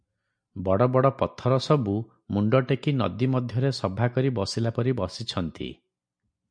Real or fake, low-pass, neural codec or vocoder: real; 9.9 kHz; none